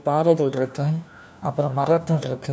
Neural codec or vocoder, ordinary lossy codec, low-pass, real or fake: codec, 16 kHz, 1 kbps, FunCodec, trained on LibriTTS, 50 frames a second; none; none; fake